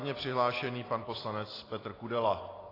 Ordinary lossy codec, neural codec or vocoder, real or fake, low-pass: AAC, 24 kbps; none; real; 5.4 kHz